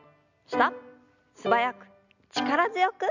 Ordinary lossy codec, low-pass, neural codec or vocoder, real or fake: none; 7.2 kHz; none; real